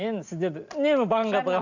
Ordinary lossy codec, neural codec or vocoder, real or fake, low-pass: none; none; real; 7.2 kHz